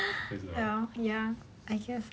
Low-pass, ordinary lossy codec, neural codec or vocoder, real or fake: none; none; none; real